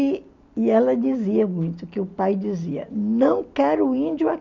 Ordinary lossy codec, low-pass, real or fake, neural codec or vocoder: none; 7.2 kHz; real; none